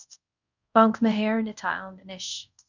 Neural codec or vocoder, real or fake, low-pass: codec, 24 kHz, 0.5 kbps, DualCodec; fake; 7.2 kHz